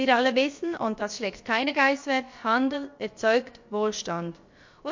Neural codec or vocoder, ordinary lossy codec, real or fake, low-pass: codec, 16 kHz, about 1 kbps, DyCAST, with the encoder's durations; MP3, 48 kbps; fake; 7.2 kHz